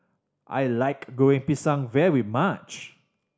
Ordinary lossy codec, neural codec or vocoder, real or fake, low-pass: none; none; real; none